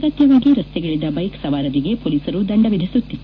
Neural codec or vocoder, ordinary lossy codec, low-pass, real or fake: none; AAC, 32 kbps; 7.2 kHz; real